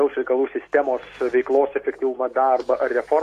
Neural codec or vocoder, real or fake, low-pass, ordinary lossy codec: none; real; 14.4 kHz; AAC, 48 kbps